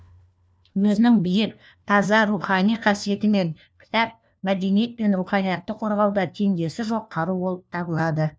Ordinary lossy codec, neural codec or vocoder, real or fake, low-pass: none; codec, 16 kHz, 1 kbps, FunCodec, trained on LibriTTS, 50 frames a second; fake; none